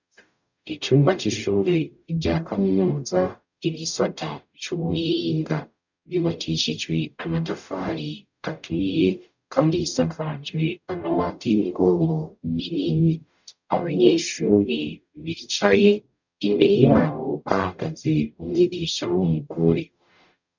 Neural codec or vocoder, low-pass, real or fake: codec, 44.1 kHz, 0.9 kbps, DAC; 7.2 kHz; fake